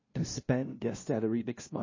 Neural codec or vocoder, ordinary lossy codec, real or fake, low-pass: codec, 16 kHz, 0.5 kbps, FunCodec, trained on LibriTTS, 25 frames a second; MP3, 32 kbps; fake; 7.2 kHz